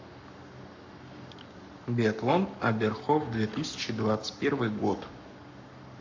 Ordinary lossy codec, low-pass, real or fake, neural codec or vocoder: AAC, 48 kbps; 7.2 kHz; fake; codec, 44.1 kHz, 7.8 kbps, Pupu-Codec